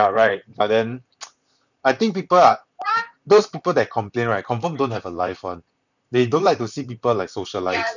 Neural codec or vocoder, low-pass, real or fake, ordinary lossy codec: vocoder, 44.1 kHz, 128 mel bands, Pupu-Vocoder; 7.2 kHz; fake; none